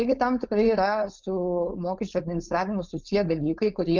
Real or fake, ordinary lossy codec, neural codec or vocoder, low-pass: fake; Opus, 24 kbps; codec, 16 kHz, 4.8 kbps, FACodec; 7.2 kHz